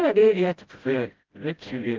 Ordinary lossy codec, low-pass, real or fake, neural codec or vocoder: Opus, 24 kbps; 7.2 kHz; fake; codec, 16 kHz, 0.5 kbps, FreqCodec, smaller model